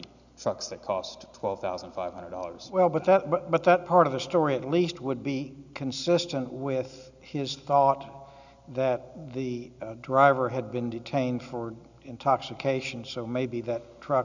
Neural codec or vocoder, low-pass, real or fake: none; 7.2 kHz; real